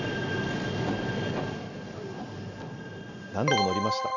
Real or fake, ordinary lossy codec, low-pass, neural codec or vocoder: real; none; 7.2 kHz; none